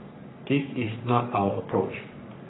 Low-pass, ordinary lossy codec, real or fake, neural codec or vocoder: 7.2 kHz; AAC, 16 kbps; fake; codec, 44.1 kHz, 3.4 kbps, Pupu-Codec